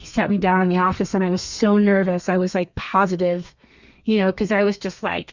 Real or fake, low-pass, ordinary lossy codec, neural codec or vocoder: fake; 7.2 kHz; Opus, 64 kbps; codec, 32 kHz, 1.9 kbps, SNAC